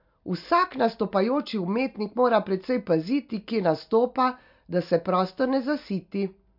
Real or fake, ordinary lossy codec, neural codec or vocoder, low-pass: real; MP3, 48 kbps; none; 5.4 kHz